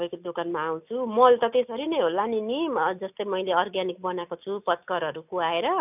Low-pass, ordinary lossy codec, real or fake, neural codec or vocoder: 3.6 kHz; none; real; none